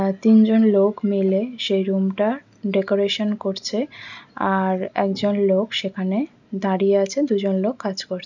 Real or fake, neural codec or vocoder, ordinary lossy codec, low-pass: real; none; none; 7.2 kHz